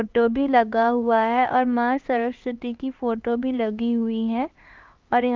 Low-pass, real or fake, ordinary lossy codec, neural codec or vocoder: 7.2 kHz; fake; Opus, 24 kbps; codec, 16 kHz, 2 kbps, X-Codec, HuBERT features, trained on LibriSpeech